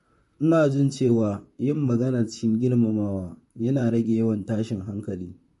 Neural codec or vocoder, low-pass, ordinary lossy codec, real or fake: vocoder, 44.1 kHz, 128 mel bands, Pupu-Vocoder; 14.4 kHz; MP3, 48 kbps; fake